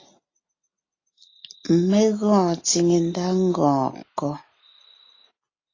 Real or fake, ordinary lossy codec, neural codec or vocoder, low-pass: real; AAC, 32 kbps; none; 7.2 kHz